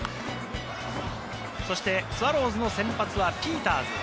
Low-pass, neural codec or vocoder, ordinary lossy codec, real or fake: none; none; none; real